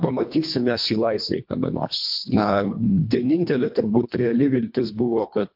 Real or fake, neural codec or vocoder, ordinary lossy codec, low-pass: fake; codec, 24 kHz, 1.5 kbps, HILCodec; MP3, 48 kbps; 5.4 kHz